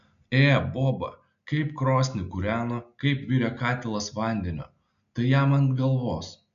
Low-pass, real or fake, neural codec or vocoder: 7.2 kHz; real; none